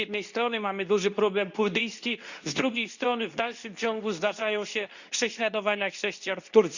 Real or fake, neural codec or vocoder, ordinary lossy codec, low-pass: fake; codec, 24 kHz, 0.9 kbps, WavTokenizer, medium speech release version 2; none; 7.2 kHz